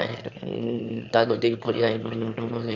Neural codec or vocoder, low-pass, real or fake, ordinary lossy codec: autoencoder, 22.05 kHz, a latent of 192 numbers a frame, VITS, trained on one speaker; 7.2 kHz; fake; Opus, 64 kbps